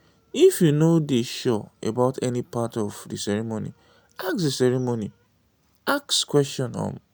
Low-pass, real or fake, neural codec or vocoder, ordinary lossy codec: none; real; none; none